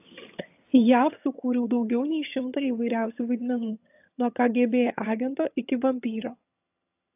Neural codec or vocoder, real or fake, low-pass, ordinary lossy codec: vocoder, 22.05 kHz, 80 mel bands, HiFi-GAN; fake; 3.6 kHz; AAC, 32 kbps